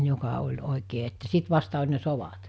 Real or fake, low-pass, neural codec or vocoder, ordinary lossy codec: real; none; none; none